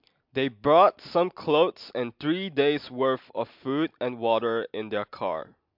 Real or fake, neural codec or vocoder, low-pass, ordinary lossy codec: real; none; 5.4 kHz; MP3, 48 kbps